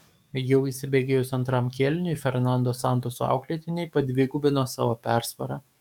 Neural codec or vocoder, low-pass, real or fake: codec, 44.1 kHz, 7.8 kbps, DAC; 19.8 kHz; fake